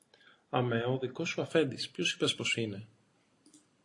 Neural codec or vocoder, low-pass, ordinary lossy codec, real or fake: none; 10.8 kHz; AAC, 48 kbps; real